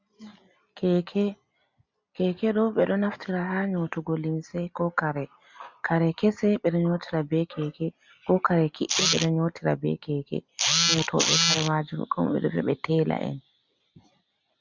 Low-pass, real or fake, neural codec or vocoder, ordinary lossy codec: 7.2 kHz; real; none; MP3, 64 kbps